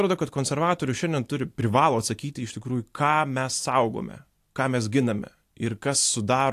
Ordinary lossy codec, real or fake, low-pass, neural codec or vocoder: AAC, 64 kbps; real; 14.4 kHz; none